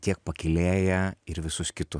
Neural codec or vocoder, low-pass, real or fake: none; 9.9 kHz; real